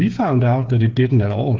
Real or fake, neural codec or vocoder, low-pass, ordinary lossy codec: fake; codec, 16 kHz, 8 kbps, FreqCodec, smaller model; 7.2 kHz; Opus, 16 kbps